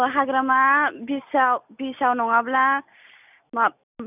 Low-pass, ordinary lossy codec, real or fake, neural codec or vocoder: 3.6 kHz; none; real; none